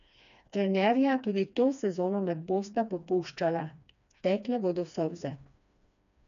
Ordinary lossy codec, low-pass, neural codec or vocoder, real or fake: none; 7.2 kHz; codec, 16 kHz, 2 kbps, FreqCodec, smaller model; fake